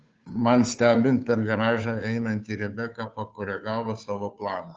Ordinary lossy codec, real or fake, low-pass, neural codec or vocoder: Opus, 24 kbps; fake; 7.2 kHz; codec, 16 kHz, 2 kbps, FunCodec, trained on Chinese and English, 25 frames a second